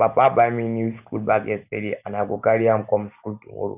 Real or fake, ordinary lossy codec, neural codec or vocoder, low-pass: real; none; none; 3.6 kHz